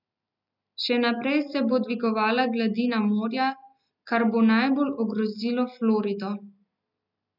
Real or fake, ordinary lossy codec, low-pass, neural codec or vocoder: real; none; 5.4 kHz; none